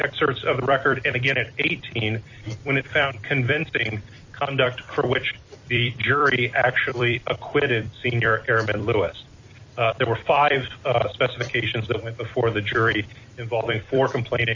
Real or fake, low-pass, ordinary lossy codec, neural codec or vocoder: fake; 7.2 kHz; AAC, 48 kbps; vocoder, 44.1 kHz, 128 mel bands every 256 samples, BigVGAN v2